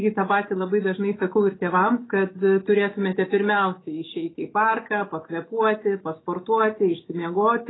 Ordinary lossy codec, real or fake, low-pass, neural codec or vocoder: AAC, 16 kbps; fake; 7.2 kHz; autoencoder, 48 kHz, 128 numbers a frame, DAC-VAE, trained on Japanese speech